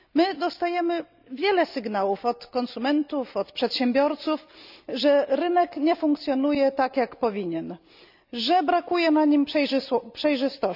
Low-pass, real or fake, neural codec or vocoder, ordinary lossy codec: 5.4 kHz; real; none; none